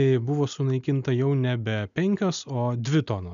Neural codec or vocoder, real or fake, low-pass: none; real; 7.2 kHz